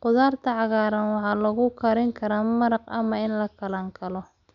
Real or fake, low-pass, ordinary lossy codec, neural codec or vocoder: real; 7.2 kHz; none; none